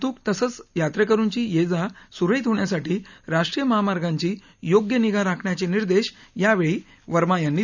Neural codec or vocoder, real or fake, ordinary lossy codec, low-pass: none; real; none; 7.2 kHz